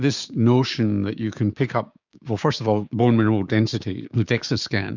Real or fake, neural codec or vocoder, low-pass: fake; codec, 16 kHz, 6 kbps, DAC; 7.2 kHz